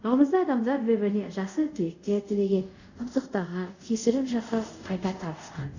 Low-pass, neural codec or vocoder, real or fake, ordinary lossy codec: 7.2 kHz; codec, 24 kHz, 0.5 kbps, DualCodec; fake; none